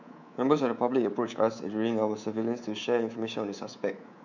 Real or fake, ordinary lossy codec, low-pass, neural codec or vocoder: fake; none; 7.2 kHz; codec, 24 kHz, 3.1 kbps, DualCodec